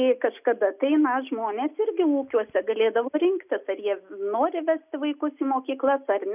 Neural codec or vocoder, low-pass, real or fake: none; 3.6 kHz; real